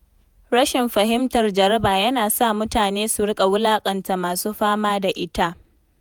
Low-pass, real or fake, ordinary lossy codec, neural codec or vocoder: none; fake; none; vocoder, 48 kHz, 128 mel bands, Vocos